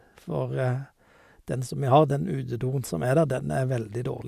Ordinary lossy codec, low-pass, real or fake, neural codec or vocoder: AAC, 96 kbps; 14.4 kHz; real; none